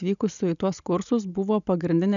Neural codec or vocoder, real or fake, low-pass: none; real; 7.2 kHz